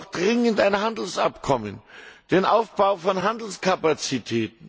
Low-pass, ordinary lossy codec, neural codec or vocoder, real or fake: none; none; none; real